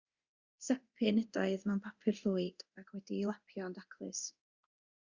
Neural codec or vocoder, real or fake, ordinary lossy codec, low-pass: codec, 24 kHz, 0.9 kbps, DualCodec; fake; Opus, 64 kbps; 7.2 kHz